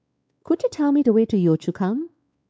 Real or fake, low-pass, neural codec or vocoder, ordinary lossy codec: fake; none; codec, 16 kHz, 4 kbps, X-Codec, WavLM features, trained on Multilingual LibriSpeech; none